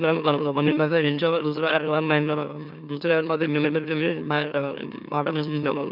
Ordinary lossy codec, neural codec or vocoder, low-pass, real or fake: none; autoencoder, 44.1 kHz, a latent of 192 numbers a frame, MeloTTS; 5.4 kHz; fake